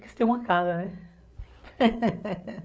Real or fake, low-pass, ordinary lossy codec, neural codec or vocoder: fake; none; none; codec, 16 kHz, 8 kbps, FreqCodec, larger model